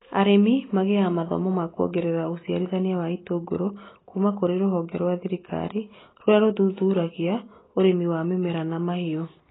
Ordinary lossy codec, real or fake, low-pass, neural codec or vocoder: AAC, 16 kbps; real; 7.2 kHz; none